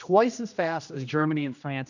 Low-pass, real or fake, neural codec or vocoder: 7.2 kHz; fake; codec, 16 kHz, 1 kbps, X-Codec, HuBERT features, trained on general audio